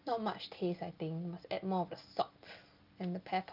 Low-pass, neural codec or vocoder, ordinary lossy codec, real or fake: 5.4 kHz; none; Opus, 24 kbps; real